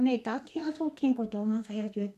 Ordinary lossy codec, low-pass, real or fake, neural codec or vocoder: none; 14.4 kHz; fake; codec, 32 kHz, 1.9 kbps, SNAC